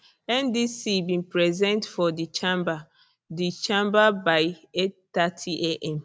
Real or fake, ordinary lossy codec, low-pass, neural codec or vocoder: real; none; none; none